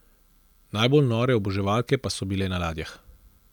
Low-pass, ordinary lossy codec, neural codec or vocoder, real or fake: 19.8 kHz; none; none; real